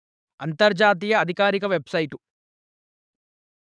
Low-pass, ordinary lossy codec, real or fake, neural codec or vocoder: 9.9 kHz; none; fake; codec, 44.1 kHz, 7.8 kbps, DAC